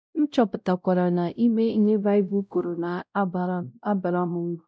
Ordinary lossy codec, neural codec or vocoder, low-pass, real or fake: none; codec, 16 kHz, 0.5 kbps, X-Codec, WavLM features, trained on Multilingual LibriSpeech; none; fake